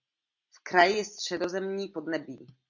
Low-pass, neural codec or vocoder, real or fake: 7.2 kHz; none; real